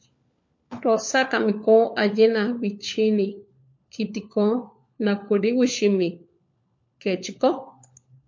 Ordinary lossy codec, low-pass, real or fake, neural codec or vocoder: MP3, 48 kbps; 7.2 kHz; fake; codec, 16 kHz, 4 kbps, FunCodec, trained on LibriTTS, 50 frames a second